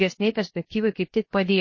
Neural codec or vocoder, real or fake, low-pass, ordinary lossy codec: codec, 16 kHz, 0.7 kbps, FocalCodec; fake; 7.2 kHz; MP3, 32 kbps